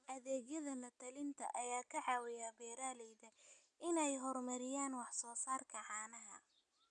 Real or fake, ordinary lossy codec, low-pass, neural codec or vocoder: real; none; 9.9 kHz; none